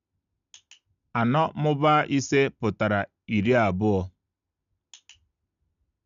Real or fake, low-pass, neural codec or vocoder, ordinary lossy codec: real; 7.2 kHz; none; none